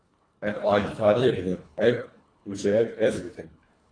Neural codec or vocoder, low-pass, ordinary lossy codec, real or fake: codec, 24 kHz, 1.5 kbps, HILCodec; 9.9 kHz; AAC, 32 kbps; fake